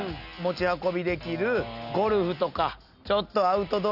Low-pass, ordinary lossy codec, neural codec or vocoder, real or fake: 5.4 kHz; none; none; real